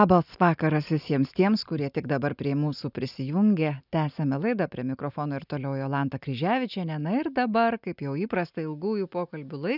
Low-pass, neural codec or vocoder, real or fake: 5.4 kHz; none; real